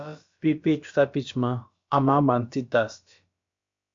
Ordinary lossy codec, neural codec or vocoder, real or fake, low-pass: MP3, 48 kbps; codec, 16 kHz, about 1 kbps, DyCAST, with the encoder's durations; fake; 7.2 kHz